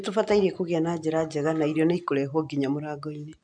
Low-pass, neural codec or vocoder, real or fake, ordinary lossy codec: 9.9 kHz; none; real; AAC, 64 kbps